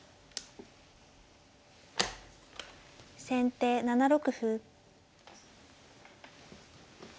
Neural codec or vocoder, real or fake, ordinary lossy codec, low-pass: none; real; none; none